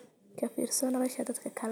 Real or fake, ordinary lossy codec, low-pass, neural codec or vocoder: real; none; none; none